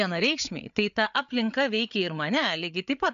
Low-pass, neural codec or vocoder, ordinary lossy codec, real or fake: 7.2 kHz; codec, 16 kHz, 8 kbps, FreqCodec, larger model; AAC, 96 kbps; fake